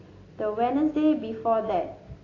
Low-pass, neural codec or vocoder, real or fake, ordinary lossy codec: 7.2 kHz; none; real; AAC, 32 kbps